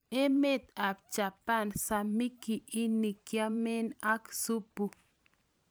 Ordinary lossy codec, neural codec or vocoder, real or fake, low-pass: none; vocoder, 44.1 kHz, 128 mel bands every 512 samples, BigVGAN v2; fake; none